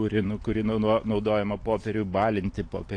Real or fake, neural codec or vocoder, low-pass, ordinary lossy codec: real; none; 9.9 kHz; AAC, 48 kbps